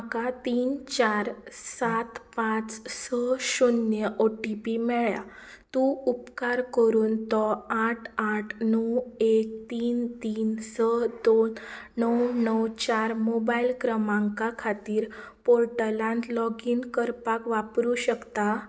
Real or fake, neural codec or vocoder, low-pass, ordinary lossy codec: real; none; none; none